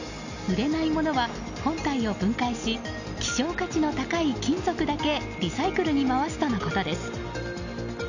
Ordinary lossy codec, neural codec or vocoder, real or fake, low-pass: none; none; real; 7.2 kHz